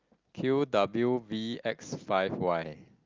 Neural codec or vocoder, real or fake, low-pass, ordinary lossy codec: none; real; 7.2 kHz; Opus, 24 kbps